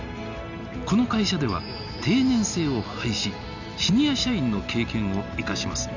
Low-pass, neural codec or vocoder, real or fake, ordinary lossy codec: 7.2 kHz; none; real; none